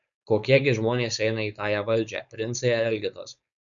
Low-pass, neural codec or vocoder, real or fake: 7.2 kHz; codec, 16 kHz, 4.8 kbps, FACodec; fake